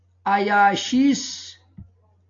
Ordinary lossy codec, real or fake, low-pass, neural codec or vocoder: AAC, 64 kbps; real; 7.2 kHz; none